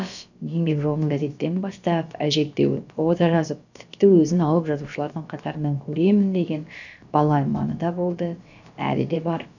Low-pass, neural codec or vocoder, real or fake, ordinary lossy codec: 7.2 kHz; codec, 16 kHz, about 1 kbps, DyCAST, with the encoder's durations; fake; none